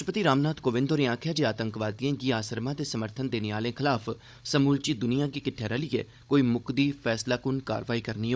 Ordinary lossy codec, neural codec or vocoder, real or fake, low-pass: none; codec, 16 kHz, 16 kbps, FunCodec, trained on Chinese and English, 50 frames a second; fake; none